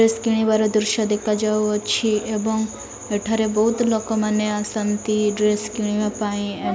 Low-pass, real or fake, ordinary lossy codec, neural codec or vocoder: none; real; none; none